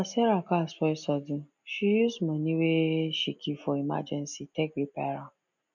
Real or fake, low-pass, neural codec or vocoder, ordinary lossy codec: real; 7.2 kHz; none; none